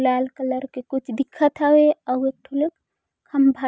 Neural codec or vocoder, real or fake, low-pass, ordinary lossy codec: none; real; none; none